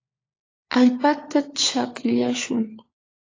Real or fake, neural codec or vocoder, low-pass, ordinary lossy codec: fake; codec, 16 kHz, 4 kbps, FunCodec, trained on LibriTTS, 50 frames a second; 7.2 kHz; AAC, 32 kbps